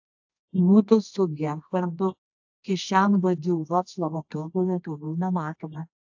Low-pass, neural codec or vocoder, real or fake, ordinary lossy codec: 7.2 kHz; codec, 24 kHz, 0.9 kbps, WavTokenizer, medium music audio release; fake; AAC, 48 kbps